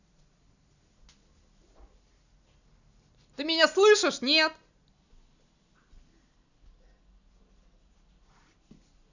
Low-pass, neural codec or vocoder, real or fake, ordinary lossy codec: 7.2 kHz; none; real; none